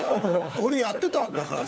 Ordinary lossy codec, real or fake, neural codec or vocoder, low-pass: none; fake; codec, 16 kHz, 4 kbps, FunCodec, trained on LibriTTS, 50 frames a second; none